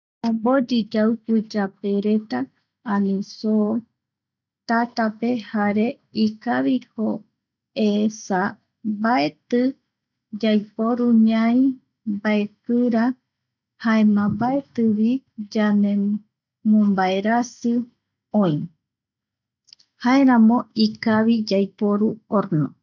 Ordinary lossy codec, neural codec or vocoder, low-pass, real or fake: none; none; 7.2 kHz; real